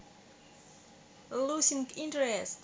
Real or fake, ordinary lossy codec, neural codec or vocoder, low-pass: real; none; none; none